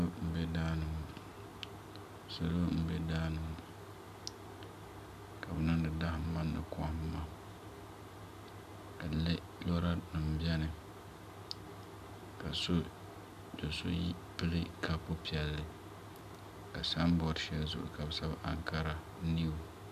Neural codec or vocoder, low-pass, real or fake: none; 14.4 kHz; real